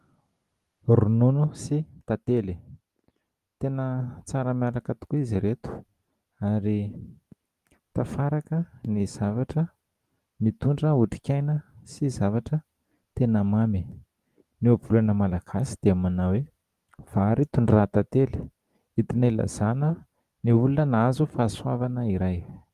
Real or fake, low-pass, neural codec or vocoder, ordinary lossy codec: real; 14.4 kHz; none; Opus, 24 kbps